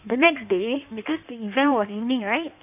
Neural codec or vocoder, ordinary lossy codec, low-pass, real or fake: codec, 16 kHz in and 24 kHz out, 1.1 kbps, FireRedTTS-2 codec; none; 3.6 kHz; fake